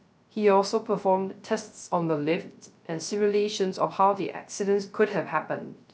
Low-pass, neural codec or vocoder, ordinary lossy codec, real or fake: none; codec, 16 kHz, 0.3 kbps, FocalCodec; none; fake